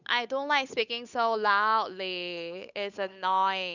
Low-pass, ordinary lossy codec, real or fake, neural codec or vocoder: 7.2 kHz; none; fake; codec, 16 kHz, 8 kbps, FunCodec, trained on Chinese and English, 25 frames a second